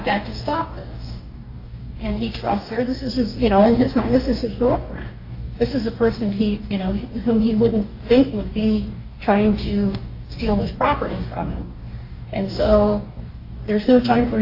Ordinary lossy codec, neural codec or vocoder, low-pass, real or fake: AAC, 32 kbps; codec, 44.1 kHz, 2.6 kbps, DAC; 5.4 kHz; fake